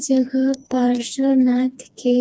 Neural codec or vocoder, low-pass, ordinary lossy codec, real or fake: codec, 16 kHz, 2 kbps, FreqCodec, smaller model; none; none; fake